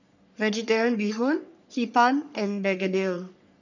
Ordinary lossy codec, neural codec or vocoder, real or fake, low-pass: none; codec, 44.1 kHz, 3.4 kbps, Pupu-Codec; fake; 7.2 kHz